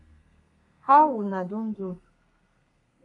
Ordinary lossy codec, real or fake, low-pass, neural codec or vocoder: AAC, 64 kbps; fake; 10.8 kHz; codec, 32 kHz, 1.9 kbps, SNAC